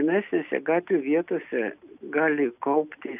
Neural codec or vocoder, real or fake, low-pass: none; real; 3.6 kHz